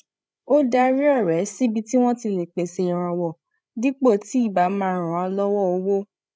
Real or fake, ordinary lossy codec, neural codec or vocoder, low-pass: fake; none; codec, 16 kHz, 16 kbps, FreqCodec, larger model; none